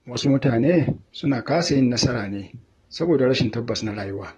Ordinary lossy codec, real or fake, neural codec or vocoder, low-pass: AAC, 32 kbps; fake; vocoder, 44.1 kHz, 128 mel bands, Pupu-Vocoder; 19.8 kHz